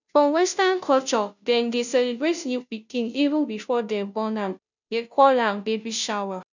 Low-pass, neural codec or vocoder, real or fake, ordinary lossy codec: 7.2 kHz; codec, 16 kHz, 0.5 kbps, FunCodec, trained on Chinese and English, 25 frames a second; fake; none